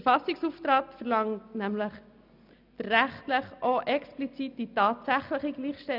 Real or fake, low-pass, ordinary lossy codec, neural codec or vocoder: real; 5.4 kHz; none; none